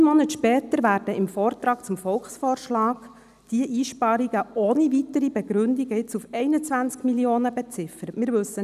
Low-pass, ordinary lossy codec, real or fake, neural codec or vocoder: 14.4 kHz; none; real; none